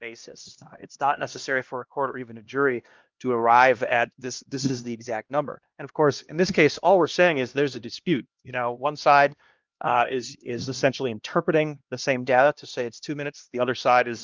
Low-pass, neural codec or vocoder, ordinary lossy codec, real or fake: 7.2 kHz; codec, 16 kHz, 1 kbps, X-Codec, HuBERT features, trained on LibriSpeech; Opus, 24 kbps; fake